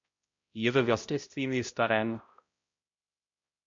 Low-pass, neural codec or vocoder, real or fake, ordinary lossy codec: 7.2 kHz; codec, 16 kHz, 0.5 kbps, X-Codec, HuBERT features, trained on balanced general audio; fake; MP3, 48 kbps